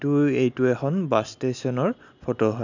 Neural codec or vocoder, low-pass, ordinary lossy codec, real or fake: none; 7.2 kHz; none; real